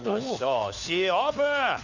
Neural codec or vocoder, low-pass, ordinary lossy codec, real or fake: codec, 16 kHz, 4 kbps, FunCodec, trained on LibriTTS, 50 frames a second; 7.2 kHz; none; fake